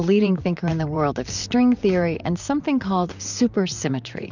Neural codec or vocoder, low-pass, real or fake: vocoder, 44.1 kHz, 128 mel bands, Pupu-Vocoder; 7.2 kHz; fake